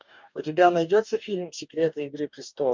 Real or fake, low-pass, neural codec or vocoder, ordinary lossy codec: fake; 7.2 kHz; codec, 44.1 kHz, 2.6 kbps, DAC; MP3, 64 kbps